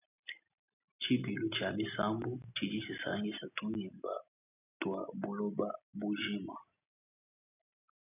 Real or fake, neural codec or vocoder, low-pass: real; none; 3.6 kHz